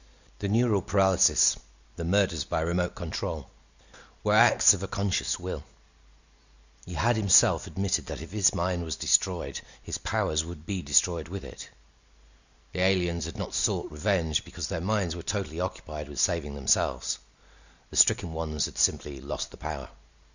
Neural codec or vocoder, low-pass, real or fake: none; 7.2 kHz; real